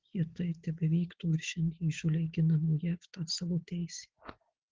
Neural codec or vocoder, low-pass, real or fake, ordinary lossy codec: none; 7.2 kHz; real; Opus, 16 kbps